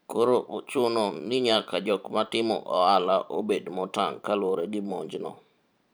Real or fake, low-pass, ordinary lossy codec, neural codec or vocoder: fake; none; none; vocoder, 44.1 kHz, 128 mel bands every 512 samples, BigVGAN v2